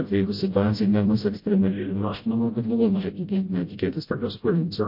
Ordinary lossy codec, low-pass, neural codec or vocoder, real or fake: MP3, 32 kbps; 5.4 kHz; codec, 16 kHz, 0.5 kbps, FreqCodec, smaller model; fake